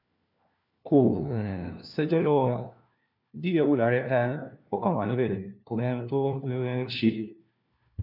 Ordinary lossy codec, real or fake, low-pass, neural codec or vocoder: none; fake; 5.4 kHz; codec, 16 kHz, 1 kbps, FunCodec, trained on LibriTTS, 50 frames a second